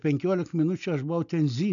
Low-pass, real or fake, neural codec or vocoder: 7.2 kHz; real; none